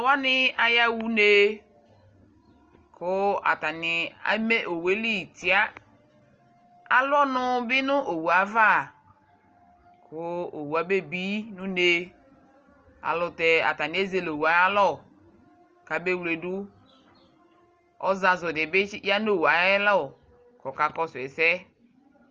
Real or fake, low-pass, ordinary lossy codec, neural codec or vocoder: real; 7.2 kHz; Opus, 24 kbps; none